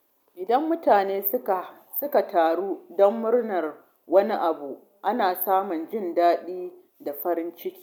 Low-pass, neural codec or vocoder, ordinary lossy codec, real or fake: 19.8 kHz; none; none; real